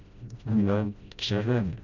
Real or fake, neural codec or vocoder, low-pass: fake; codec, 16 kHz, 0.5 kbps, FreqCodec, smaller model; 7.2 kHz